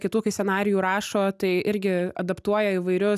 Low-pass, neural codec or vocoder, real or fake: 14.4 kHz; none; real